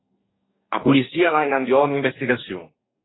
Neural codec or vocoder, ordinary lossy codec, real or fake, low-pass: codec, 44.1 kHz, 2.6 kbps, DAC; AAC, 16 kbps; fake; 7.2 kHz